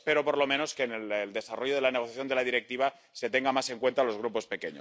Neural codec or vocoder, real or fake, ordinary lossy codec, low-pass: none; real; none; none